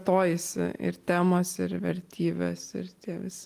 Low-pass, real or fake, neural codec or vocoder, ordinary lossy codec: 14.4 kHz; real; none; Opus, 24 kbps